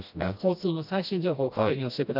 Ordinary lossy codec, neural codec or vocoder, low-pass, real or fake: none; codec, 16 kHz, 1 kbps, FreqCodec, smaller model; 5.4 kHz; fake